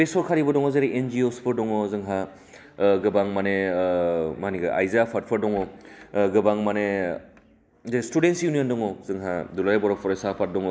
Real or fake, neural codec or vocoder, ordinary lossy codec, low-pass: real; none; none; none